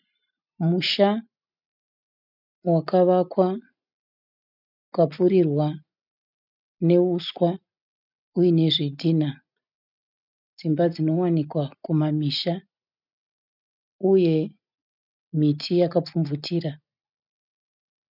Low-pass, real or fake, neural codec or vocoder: 5.4 kHz; real; none